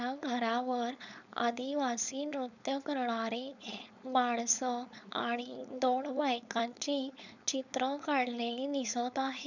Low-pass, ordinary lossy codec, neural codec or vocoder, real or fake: 7.2 kHz; none; codec, 16 kHz, 4.8 kbps, FACodec; fake